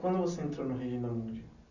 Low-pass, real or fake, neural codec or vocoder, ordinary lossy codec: 7.2 kHz; real; none; none